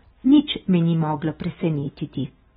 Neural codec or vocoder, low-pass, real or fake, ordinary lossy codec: none; 19.8 kHz; real; AAC, 16 kbps